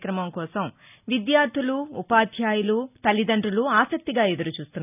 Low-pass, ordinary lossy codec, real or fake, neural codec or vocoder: 3.6 kHz; none; real; none